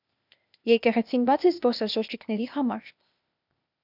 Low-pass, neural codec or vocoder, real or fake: 5.4 kHz; codec, 16 kHz, 0.8 kbps, ZipCodec; fake